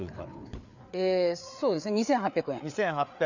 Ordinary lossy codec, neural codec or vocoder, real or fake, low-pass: none; codec, 16 kHz, 4 kbps, FreqCodec, larger model; fake; 7.2 kHz